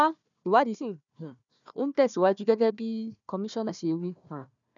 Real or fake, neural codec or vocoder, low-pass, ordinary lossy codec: fake; codec, 16 kHz, 1 kbps, FunCodec, trained on Chinese and English, 50 frames a second; 7.2 kHz; none